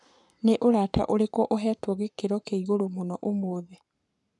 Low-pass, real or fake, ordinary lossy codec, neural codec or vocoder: 10.8 kHz; fake; none; codec, 44.1 kHz, 7.8 kbps, Pupu-Codec